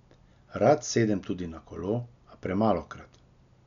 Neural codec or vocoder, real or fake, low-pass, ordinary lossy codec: none; real; 7.2 kHz; none